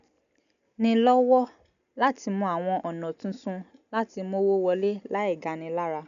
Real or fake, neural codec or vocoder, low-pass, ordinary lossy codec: real; none; 7.2 kHz; none